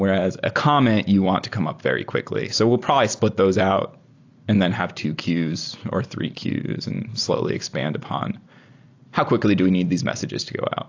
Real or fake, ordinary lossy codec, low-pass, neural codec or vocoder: real; AAC, 48 kbps; 7.2 kHz; none